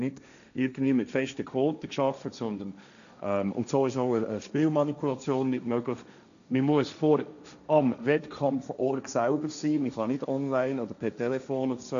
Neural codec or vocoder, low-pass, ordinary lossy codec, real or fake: codec, 16 kHz, 1.1 kbps, Voila-Tokenizer; 7.2 kHz; none; fake